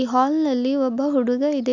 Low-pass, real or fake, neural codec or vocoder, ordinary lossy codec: 7.2 kHz; real; none; none